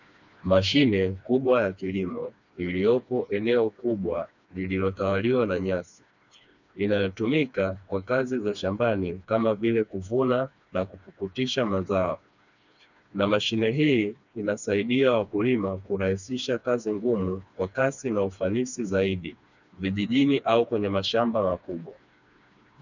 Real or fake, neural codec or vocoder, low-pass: fake; codec, 16 kHz, 2 kbps, FreqCodec, smaller model; 7.2 kHz